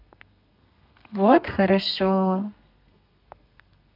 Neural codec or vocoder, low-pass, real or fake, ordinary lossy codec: codec, 32 kHz, 1.9 kbps, SNAC; 5.4 kHz; fake; MP3, 48 kbps